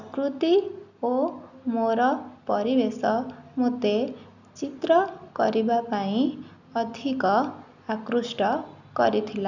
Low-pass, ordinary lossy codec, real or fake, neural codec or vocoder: 7.2 kHz; none; real; none